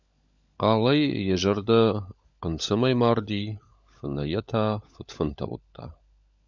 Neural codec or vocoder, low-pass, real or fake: codec, 16 kHz, 16 kbps, FunCodec, trained on LibriTTS, 50 frames a second; 7.2 kHz; fake